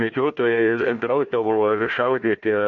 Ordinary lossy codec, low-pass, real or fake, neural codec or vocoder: MP3, 48 kbps; 7.2 kHz; fake; codec, 16 kHz, 1 kbps, FunCodec, trained on Chinese and English, 50 frames a second